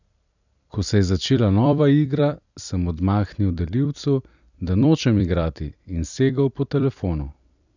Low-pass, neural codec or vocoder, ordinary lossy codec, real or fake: 7.2 kHz; vocoder, 44.1 kHz, 128 mel bands every 256 samples, BigVGAN v2; none; fake